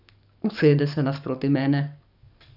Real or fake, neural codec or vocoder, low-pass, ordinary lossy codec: fake; codec, 44.1 kHz, 7.8 kbps, DAC; 5.4 kHz; none